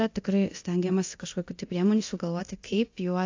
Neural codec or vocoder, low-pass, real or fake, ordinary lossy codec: codec, 24 kHz, 0.9 kbps, DualCodec; 7.2 kHz; fake; AAC, 48 kbps